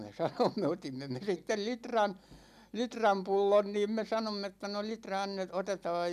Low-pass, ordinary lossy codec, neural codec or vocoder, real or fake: 14.4 kHz; none; none; real